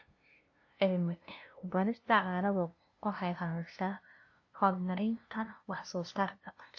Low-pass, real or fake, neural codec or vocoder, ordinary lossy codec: 7.2 kHz; fake; codec, 16 kHz, 0.5 kbps, FunCodec, trained on LibriTTS, 25 frames a second; AAC, 48 kbps